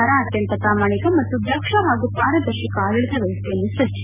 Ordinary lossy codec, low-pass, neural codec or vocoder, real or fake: none; 3.6 kHz; none; real